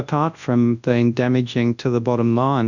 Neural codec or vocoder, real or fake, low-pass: codec, 24 kHz, 0.9 kbps, WavTokenizer, large speech release; fake; 7.2 kHz